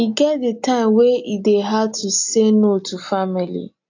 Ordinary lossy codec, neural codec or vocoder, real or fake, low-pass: AAC, 48 kbps; none; real; 7.2 kHz